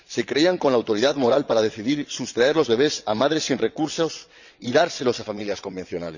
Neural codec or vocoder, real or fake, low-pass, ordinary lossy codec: vocoder, 22.05 kHz, 80 mel bands, WaveNeXt; fake; 7.2 kHz; none